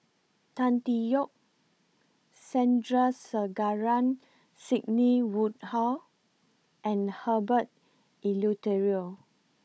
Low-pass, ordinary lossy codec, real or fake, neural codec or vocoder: none; none; fake; codec, 16 kHz, 16 kbps, FunCodec, trained on Chinese and English, 50 frames a second